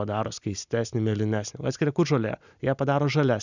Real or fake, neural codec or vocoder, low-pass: real; none; 7.2 kHz